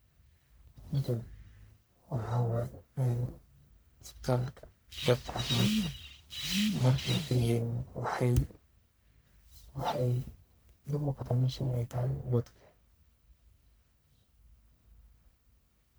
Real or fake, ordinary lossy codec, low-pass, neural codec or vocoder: fake; none; none; codec, 44.1 kHz, 1.7 kbps, Pupu-Codec